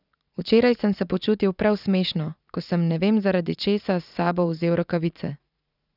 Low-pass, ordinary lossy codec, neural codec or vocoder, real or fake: 5.4 kHz; none; vocoder, 44.1 kHz, 80 mel bands, Vocos; fake